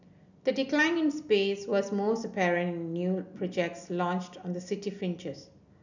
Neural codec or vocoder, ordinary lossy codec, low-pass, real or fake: none; none; 7.2 kHz; real